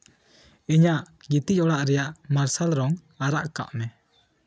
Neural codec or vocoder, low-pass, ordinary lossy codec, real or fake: none; none; none; real